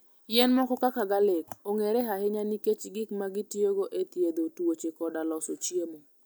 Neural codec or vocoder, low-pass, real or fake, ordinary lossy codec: none; none; real; none